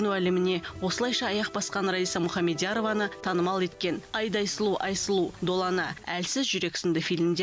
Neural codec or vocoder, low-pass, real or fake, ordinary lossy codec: none; none; real; none